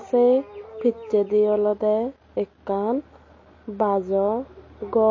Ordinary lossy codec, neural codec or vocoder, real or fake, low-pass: MP3, 32 kbps; none; real; 7.2 kHz